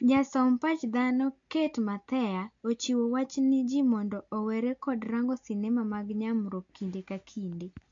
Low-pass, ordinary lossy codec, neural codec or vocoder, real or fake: 7.2 kHz; AAC, 48 kbps; none; real